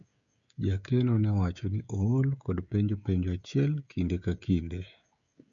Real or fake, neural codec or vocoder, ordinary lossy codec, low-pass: fake; codec, 16 kHz, 16 kbps, FreqCodec, smaller model; none; 7.2 kHz